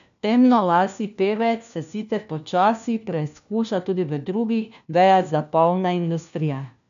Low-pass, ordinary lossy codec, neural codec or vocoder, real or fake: 7.2 kHz; none; codec, 16 kHz, 1 kbps, FunCodec, trained on LibriTTS, 50 frames a second; fake